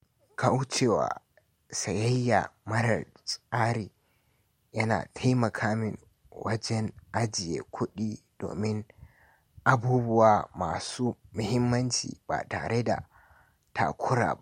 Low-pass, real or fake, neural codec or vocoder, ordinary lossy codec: 19.8 kHz; real; none; MP3, 64 kbps